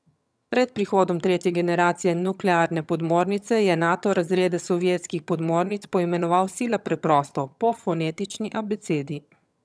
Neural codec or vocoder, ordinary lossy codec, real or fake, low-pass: vocoder, 22.05 kHz, 80 mel bands, HiFi-GAN; none; fake; none